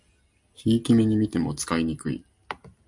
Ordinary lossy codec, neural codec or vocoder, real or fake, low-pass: MP3, 64 kbps; none; real; 10.8 kHz